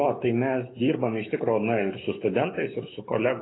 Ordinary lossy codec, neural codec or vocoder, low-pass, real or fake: AAC, 16 kbps; autoencoder, 48 kHz, 128 numbers a frame, DAC-VAE, trained on Japanese speech; 7.2 kHz; fake